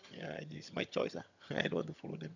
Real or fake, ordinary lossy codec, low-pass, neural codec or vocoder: fake; none; 7.2 kHz; vocoder, 22.05 kHz, 80 mel bands, HiFi-GAN